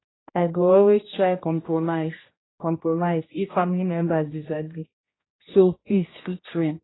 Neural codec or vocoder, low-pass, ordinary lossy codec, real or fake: codec, 16 kHz, 1 kbps, X-Codec, HuBERT features, trained on general audio; 7.2 kHz; AAC, 16 kbps; fake